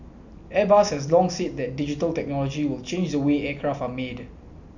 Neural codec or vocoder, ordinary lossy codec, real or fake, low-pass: none; none; real; 7.2 kHz